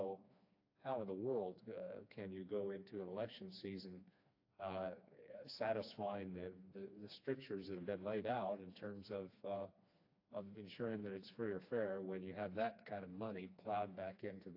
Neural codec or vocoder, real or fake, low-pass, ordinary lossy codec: codec, 16 kHz, 2 kbps, FreqCodec, smaller model; fake; 5.4 kHz; AAC, 32 kbps